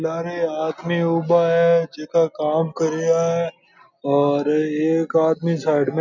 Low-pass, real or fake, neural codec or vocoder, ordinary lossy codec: 7.2 kHz; real; none; none